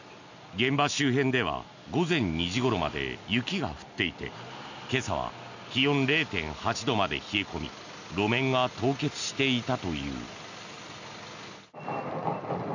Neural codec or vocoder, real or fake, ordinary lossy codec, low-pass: none; real; none; 7.2 kHz